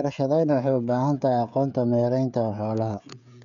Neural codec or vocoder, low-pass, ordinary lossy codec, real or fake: codec, 16 kHz, 16 kbps, FreqCodec, smaller model; 7.2 kHz; none; fake